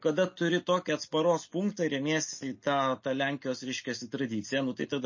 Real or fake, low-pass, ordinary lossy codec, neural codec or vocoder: real; 7.2 kHz; MP3, 32 kbps; none